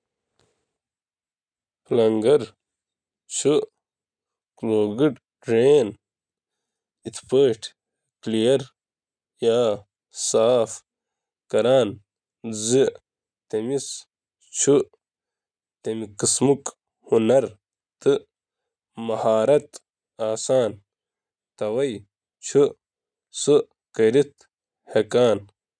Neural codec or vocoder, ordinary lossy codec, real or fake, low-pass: none; none; real; 9.9 kHz